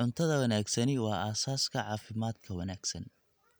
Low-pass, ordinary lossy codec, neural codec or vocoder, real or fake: none; none; none; real